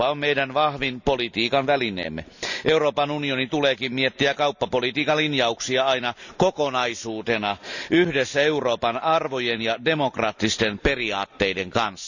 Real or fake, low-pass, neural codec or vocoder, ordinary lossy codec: real; 7.2 kHz; none; none